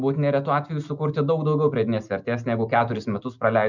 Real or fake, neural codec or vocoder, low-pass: real; none; 7.2 kHz